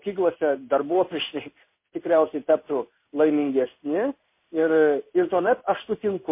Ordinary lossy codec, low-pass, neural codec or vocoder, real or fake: MP3, 24 kbps; 3.6 kHz; codec, 16 kHz in and 24 kHz out, 1 kbps, XY-Tokenizer; fake